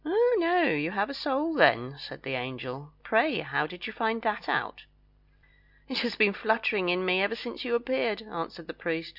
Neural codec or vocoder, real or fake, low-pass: none; real; 5.4 kHz